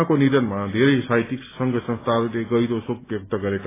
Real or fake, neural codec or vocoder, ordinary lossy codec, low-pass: real; none; AAC, 16 kbps; 3.6 kHz